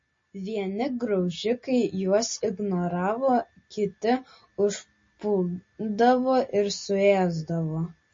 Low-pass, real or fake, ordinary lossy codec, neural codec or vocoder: 7.2 kHz; real; MP3, 32 kbps; none